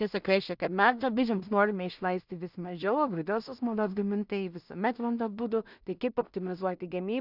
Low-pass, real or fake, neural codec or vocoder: 5.4 kHz; fake; codec, 16 kHz in and 24 kHz out, 0.4 kbps, LongCat-Audio-Codec, two codebook decoder